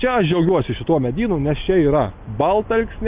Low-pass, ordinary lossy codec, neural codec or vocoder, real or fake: 3.6 kHz; Opus, 32 kbps; none; real